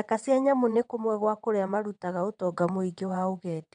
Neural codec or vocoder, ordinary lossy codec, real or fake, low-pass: vocoder, 22.05 kHz, 80 mel bands, WaveNeXt; none; fake; 9.9 kHz